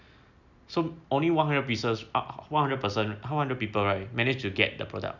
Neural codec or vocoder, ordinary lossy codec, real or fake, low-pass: none; none; real; 7.2 kHz